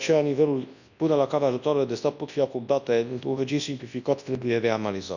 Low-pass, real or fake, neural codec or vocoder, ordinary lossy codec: 7.2 kHz; fake; codec, 24 kHz, 0.9 kbps, WavTokenizer, large speech release; none